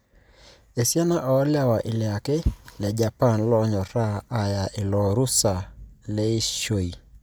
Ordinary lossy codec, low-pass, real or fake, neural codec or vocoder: none; none; real; none